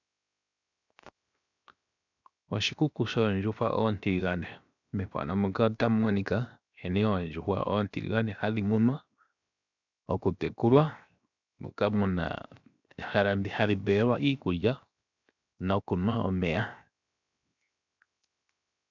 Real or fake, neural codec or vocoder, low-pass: fake; codec, 16 kHz, 0.7 kbps, FocalCodec; 7.2 kHz